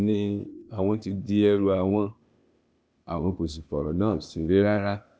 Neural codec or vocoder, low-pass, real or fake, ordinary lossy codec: codec, 16 kHz, 0.8 kbps, ZipCodec; none; fake; none